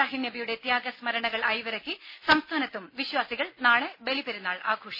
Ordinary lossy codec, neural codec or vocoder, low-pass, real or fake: MP3, 24 kbps; none; 5.4 kHz; real